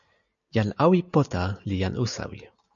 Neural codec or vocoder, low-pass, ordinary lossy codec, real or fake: none; 7.2 kHz; MP3, 96 kbps; real